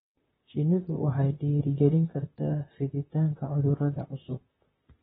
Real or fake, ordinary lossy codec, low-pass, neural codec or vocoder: fake; AAC, 16 kbps; 19.8 kHz; vocoder, 44.1 kHz, 128 mel bands, Pupu-Vocoder